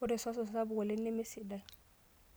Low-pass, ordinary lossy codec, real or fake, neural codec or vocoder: none; none; real; none